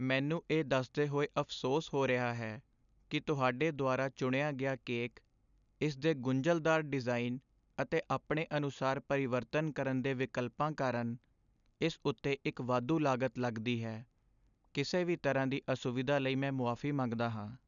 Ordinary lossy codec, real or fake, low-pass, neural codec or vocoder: none; real; 7.2 kHz; none